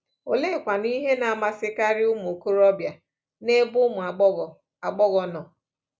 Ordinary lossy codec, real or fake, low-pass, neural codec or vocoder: none; real; none; none